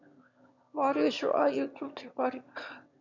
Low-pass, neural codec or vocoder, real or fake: 7.2 kHz; autoencoder, 22.05 kHz, a latent of 192 numbers a frame, VITS, trained on one speaker; fake